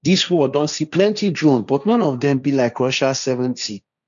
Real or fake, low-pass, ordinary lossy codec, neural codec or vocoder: fake; 7.2 kHz; none; codec, 16 kHz, 1.1 kbps, Voila-Tokenizer